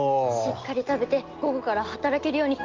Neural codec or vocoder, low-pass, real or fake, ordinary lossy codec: none; 7.2 kHz; real; Opus, 16 kbps